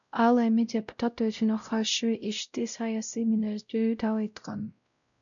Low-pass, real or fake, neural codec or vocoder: 7.2 kHz; fake; codec, 16 kHz, 0.5 kbps, X-Codec, WavLM features, trained on Multilingual LibriSpeech